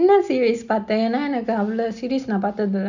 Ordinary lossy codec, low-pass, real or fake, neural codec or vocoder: none; 7.2 kHz; real; none